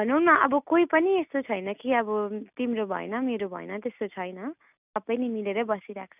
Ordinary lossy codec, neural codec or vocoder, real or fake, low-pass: none; none; real; 3.6 kHz